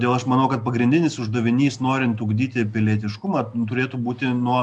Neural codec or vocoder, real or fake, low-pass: none; real; 10.8 kHz